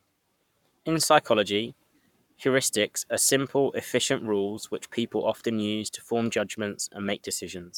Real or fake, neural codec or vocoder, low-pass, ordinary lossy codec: fake; codec, 44.1 kHz, 7.8 kbps, Pupu-Codec; 19.8 kHz; none